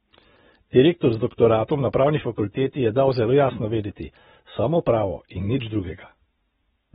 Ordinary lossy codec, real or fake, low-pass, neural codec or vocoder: AAC, 16 kbps; real; 19.8 kHz; none